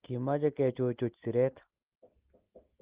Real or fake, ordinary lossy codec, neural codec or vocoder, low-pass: real; Opus, 16 kbps; none; 3.6 kHz